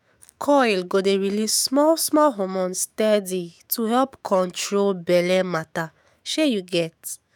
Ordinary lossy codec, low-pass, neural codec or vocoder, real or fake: none; none; autoencoder, 48 kHz, 128 numbers a frame, DAC-VAE, trained on Japanese speech; fake